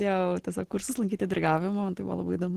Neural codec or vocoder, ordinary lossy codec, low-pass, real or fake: none; Opus, 16 kbps; 14.4 kHz; real